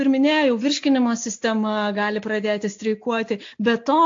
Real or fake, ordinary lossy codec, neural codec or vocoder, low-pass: real; AAC, 48 kbps; none; 7.2 kHz